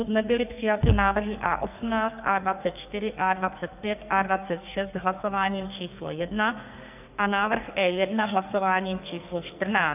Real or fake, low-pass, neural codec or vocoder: fake; 3.6 kHz; codec, 44.1 kHz, 2.6 kbps, SNAC